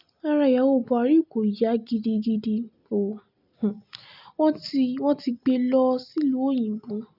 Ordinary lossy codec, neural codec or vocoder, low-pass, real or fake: none; none; 5.4 kHz; real